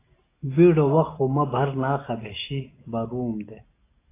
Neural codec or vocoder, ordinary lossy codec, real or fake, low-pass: none; AAC, 24 kbps; real; 3.6 kHz